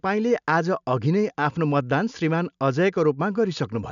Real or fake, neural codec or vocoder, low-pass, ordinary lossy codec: real; none; 7.2 kHz; none